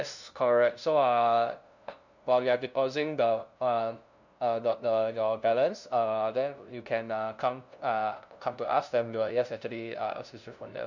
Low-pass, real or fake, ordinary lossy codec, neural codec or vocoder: 7.2 kHz; fake; none; codec, 16 kHz, 0.5 kbps, FunCodec, trained on LibriTTS, 25 frames a second